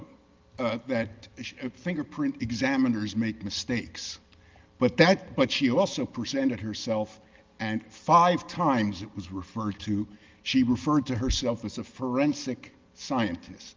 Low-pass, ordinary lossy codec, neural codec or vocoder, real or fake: 7.2 kHz; Opus, 32 kbps; none; real